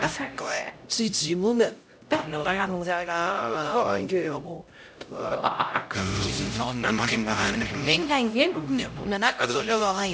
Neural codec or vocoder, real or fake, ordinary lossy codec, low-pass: codec, 16 kHz, 0.5 kbps, X-Codec, HuBERT features, trained on LibriSpeech; fake; none; none